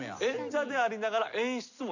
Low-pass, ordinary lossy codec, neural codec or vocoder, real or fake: 7.2 kHz; none; none; real